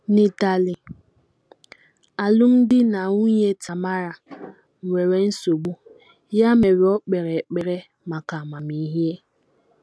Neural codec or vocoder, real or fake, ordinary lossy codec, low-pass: none; real; none; none